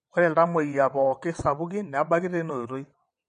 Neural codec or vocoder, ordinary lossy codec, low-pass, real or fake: vocoder, 22.05 kHz, 80 mel bands, WaveNeXt; MP3, 48 kbps; 9.9 kHz; fake